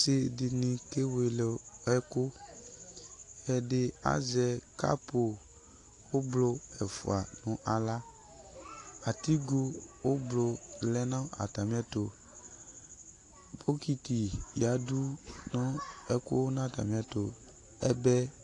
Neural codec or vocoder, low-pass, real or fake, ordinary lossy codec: none; 10.8 kHz; real; AAC, 48 kbps